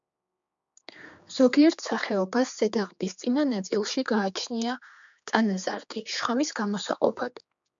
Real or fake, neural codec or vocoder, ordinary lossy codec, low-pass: fake; codec, 16 kHz, 4 kbps, X-Codec, HuBERT features, trained on general audio; MP3, 64 kbps; 7.2 kHz